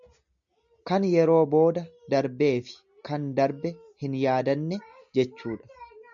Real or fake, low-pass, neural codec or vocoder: real; 7.2 kHz; none